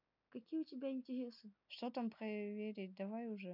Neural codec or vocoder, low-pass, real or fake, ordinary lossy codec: none; 5.4 kHz; real; none